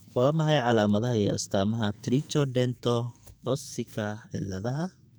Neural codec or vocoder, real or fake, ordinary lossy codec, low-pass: codec, 44.1 kHz, 2.6 kbps, SNAC; fake; none; none